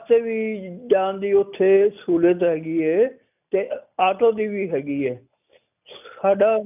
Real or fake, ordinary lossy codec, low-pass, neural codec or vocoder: real; none; 3.6 kHz; none